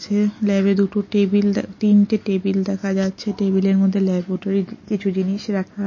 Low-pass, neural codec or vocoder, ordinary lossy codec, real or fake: 7.2 kHz; none; MP3, 32 kbps; real